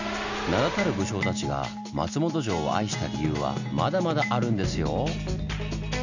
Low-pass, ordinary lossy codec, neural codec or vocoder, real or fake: 7.2 kHz; none; none; real